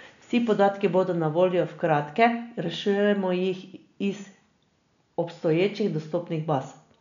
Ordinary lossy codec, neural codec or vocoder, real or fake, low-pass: none; none; real; 7.2 kHz